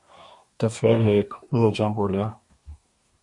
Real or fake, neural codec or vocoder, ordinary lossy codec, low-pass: fake; codec, 24 kHz, 1 kbps, SNAC; MP3, 48 kbps; 10.8 kHz